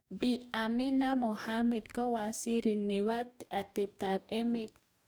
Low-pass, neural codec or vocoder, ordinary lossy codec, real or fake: none; codec, 44.1 kHz, 2.6 kbps, DAC; none; fake